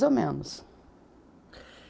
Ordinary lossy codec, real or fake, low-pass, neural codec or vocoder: none; real; none; none